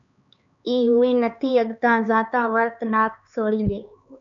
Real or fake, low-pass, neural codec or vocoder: fake; 7.2 kHz; codec, 16 kHz, 4 kbps, X-Codec, HuBERT features, trained on LibriSpeech